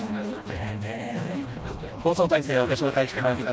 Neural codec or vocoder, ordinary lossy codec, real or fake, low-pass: codec, 16 kHz, 1 kbps, FreqCodec, smaller model; none; fake; none